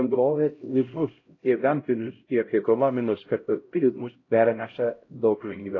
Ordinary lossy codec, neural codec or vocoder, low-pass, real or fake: AAC, 32 kbps; codec, 16 kHz, 0.5 kbps, X-Codec, HuBERT features, trained on LibriSpeech; 7.2 kHz; fake